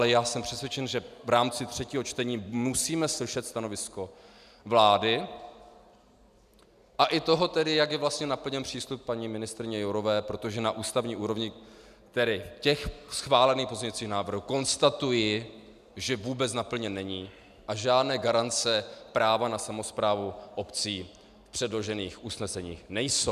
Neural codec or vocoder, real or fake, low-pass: none; real; 14.4 kHz